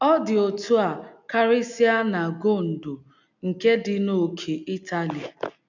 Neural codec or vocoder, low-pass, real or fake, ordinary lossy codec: none; 7.2 kHz; real; none